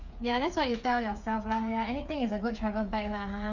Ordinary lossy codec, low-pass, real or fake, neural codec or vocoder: none; 7.2 kHz; fake; codec, 16 kHz, 8 kbps, FreqCodec, smaller model